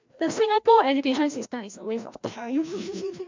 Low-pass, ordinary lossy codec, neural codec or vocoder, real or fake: 7.2 kHz; MP3, 64 kbps; codec, 16 kHz, 1 kbps, FreqCodec, larger model; fake